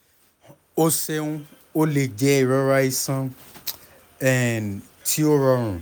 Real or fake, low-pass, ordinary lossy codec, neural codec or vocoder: real; none; none; none